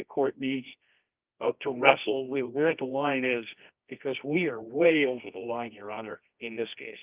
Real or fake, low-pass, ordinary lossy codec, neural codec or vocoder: fake; 3.6 kHz; Opus, 24 kbps; codec, 24 kHz, 0.9 kbps, WavTokenizer, medium music audio release